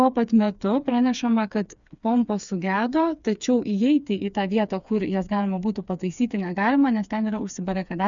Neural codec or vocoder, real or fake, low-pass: codec, 16 kHz, 4 kbps, FreqCodec, smaller model; fake; 7.2 kHz